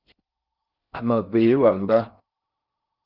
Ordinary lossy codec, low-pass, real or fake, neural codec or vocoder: Opus, 24 kbps; 5.4 kHz; fake; codec, 16 kHz in and 24 kHz out, 0.6 kbps, FocalCodec, streaming, 4096 codes